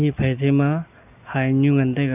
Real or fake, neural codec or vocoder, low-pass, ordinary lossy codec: real; none; 3.6 kHz; none